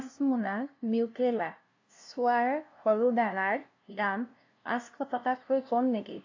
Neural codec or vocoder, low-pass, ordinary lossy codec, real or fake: codec, 16 kHz, 0.5 kbps, FunCodec, trained on LibriTTS, 25 frames a second; 7.2 kHz; AAC, 48 kbps; fake